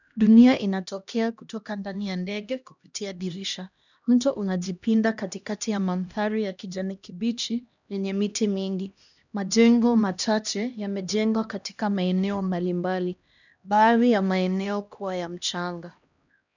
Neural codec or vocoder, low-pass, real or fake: codec, 16 kHz, 1 kbps, X-Codec, HuBERT features, trained on LibriSpeech; 7.2 kHz; fake